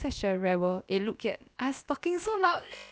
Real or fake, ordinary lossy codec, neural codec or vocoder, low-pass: fake; none; codec, 16 kHz, about 1 kbps, DyCAST, with the encoder's durations; none